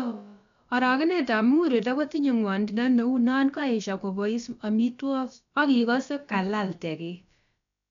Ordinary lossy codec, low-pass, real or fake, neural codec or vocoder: none; 7.2 kHz; fake; codec, 16 kHz, about 1 kbps, DyCAST, with the encoder's durations